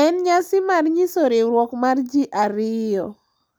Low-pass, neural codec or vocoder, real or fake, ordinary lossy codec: none; none; real; none